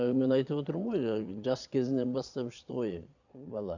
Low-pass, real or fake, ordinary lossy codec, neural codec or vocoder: 7.2 kHz; fake; none; vocoder, 22.05 kHz, 80 mel bands, Vocos